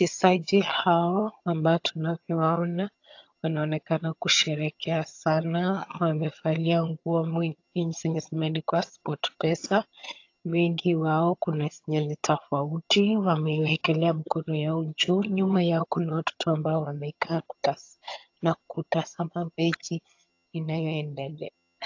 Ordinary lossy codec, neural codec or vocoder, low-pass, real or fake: AAC, 48 kbps; vocoder, 22.05 kHz, 80 mel bands, HiFi-GAN; 7.2 kHz; fake